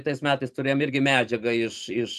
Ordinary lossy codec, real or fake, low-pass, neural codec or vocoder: MP3, 96 kbps; fake; 14.4 kHz; vocoder, 44.1 kHz, 128 mel bands every 512 samples, BigVGAN v2